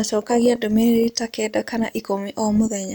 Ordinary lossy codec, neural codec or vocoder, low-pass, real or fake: none; none; none; real